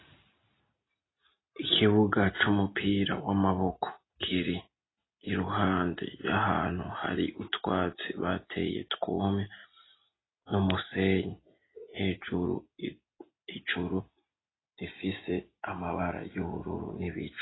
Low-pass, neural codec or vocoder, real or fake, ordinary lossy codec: 7.2 kHz; none; real; AAC, 16 kbps